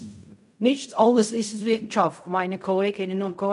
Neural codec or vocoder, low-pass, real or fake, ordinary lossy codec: codec, 16 kHz in and 24 kHz out, 0.4 kbps, LongCat-Audio-Codec, fine tuned four codebook decoder; 10.8 kHz; fake; none